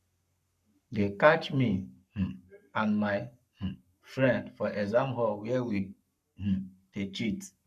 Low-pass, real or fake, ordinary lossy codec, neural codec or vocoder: 14.4 kHz; fake; none; codec, 44.1 kHz, 7.8 kbps, Pupu-Codec